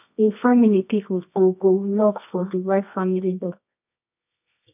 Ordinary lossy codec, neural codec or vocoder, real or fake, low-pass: none; codec, 24 kHz, 0.9 kbps, WavTokenizer, medium music audio release; fake; 3.6 kHz